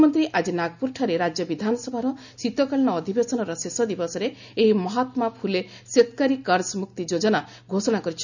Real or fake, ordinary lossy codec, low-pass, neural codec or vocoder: real; none; 7.2 kHz; none